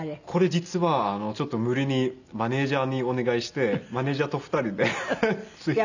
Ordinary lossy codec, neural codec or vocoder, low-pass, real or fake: none; none; 7.2 kHz; real